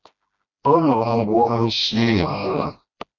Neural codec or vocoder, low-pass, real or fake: codec, 16 kHz, 1 kbps, FreqCodec, smaller model; 7.2 kHz; fake